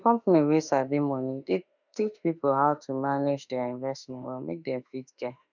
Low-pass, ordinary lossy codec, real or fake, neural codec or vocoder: 7.2 kHz; none; fake; autoencoder, 48 kHz, 32 numbers a frame, DAC-VAE, trained on Japanese speech